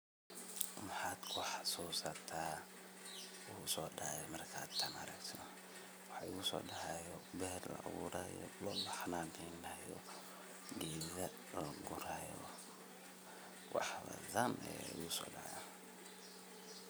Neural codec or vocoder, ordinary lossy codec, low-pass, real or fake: none; none; none; real